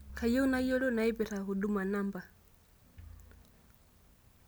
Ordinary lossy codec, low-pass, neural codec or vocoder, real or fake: none; none; none; real